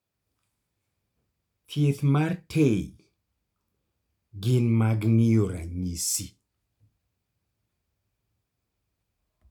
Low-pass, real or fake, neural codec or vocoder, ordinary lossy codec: 19.8 kHz; real; none; none